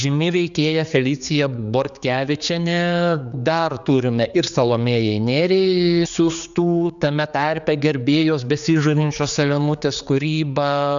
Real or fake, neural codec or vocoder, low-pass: fake; codec, 16 kHz, 4 kbps, X-Codec, HuBERT features, trained on general audio; 7.2 kHz